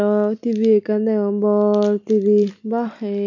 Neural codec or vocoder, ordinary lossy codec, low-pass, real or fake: none; none; 7.2 kHz; real